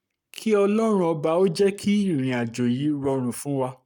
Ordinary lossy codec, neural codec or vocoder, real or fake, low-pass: none; codec, 44.1 kHz, 7.8 kbps, Pupu-Codec; fake; 19.8 kHz